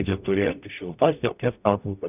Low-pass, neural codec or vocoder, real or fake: 3.6 kHz; codec, 44.1 kHz, 0.9 kbps, DAC; fake